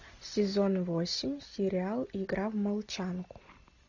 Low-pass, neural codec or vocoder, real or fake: 7.2 kHz; none; real